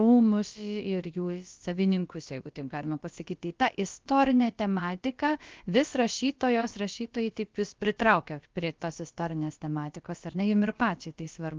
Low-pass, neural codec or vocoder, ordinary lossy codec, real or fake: 7.2 kHz; codec, 16 kHz, about 1 kbps, DyCAST, with the encoder's durations; Opus, 32 kbps; fake